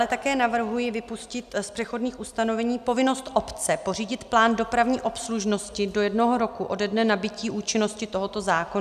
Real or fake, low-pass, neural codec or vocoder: real; 14.4 kHz; none